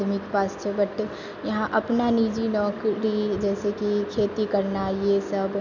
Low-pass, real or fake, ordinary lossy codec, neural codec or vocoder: 7.2 kHz; real; none; none